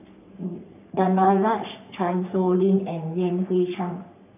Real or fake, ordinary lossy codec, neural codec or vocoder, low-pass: fake; none; codec, 44.1 kHz, 3.4 kbps, Pupu-Codec; 3.6 kHz